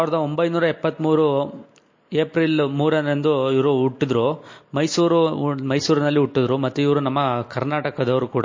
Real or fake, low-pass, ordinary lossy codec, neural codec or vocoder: real; 7.2 kHz; MP3, 32 kbps; none